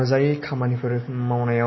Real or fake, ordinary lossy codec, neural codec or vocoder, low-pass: real; MP3, 24 kbps; none; 7.2 kHz